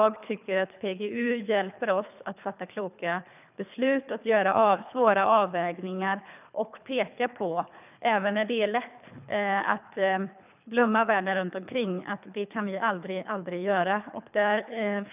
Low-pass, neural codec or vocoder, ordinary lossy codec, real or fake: 3.6 kHz; codec, 24 kHz, 3 kbps, HILCodec; none; fake